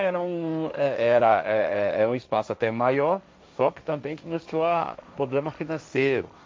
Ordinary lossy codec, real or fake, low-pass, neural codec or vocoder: none; fake; none; codec, 16 kHz, 1.1 kbps, Voila-Tokenizer